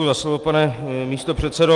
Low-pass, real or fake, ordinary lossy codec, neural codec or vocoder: 10.8 kHz; real; Opus, 32 kbps; none